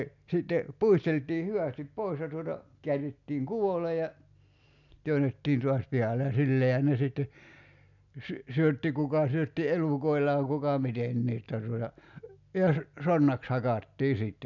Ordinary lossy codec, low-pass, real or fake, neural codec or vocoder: none; 7.2 kHz; real; none